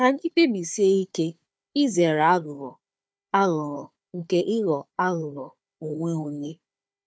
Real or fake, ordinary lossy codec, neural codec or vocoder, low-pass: fake; none; codec, 16 kHz, 4 kbps, FunCodec, trained on Chinese and English, 50 frames a second; none